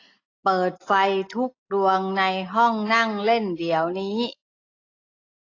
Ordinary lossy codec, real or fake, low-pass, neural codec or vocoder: AAC, 32 kbps; real; 7.2 kHz; none